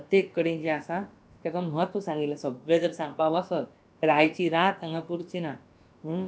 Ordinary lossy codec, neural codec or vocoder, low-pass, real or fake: none; codec, 16 kHz, about 1 kbps, DyCAST, with the encoder's durations; none; fake